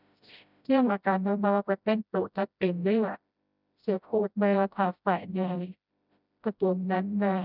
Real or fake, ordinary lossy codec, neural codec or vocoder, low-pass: fake; none; codec, 16 kHz, 0.5 kbps, FreqCodec, smaller model; 5.4 kHz